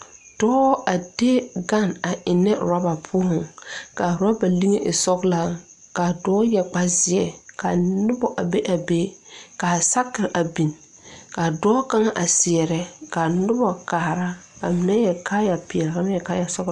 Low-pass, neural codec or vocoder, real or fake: 10.8 kHz; none; real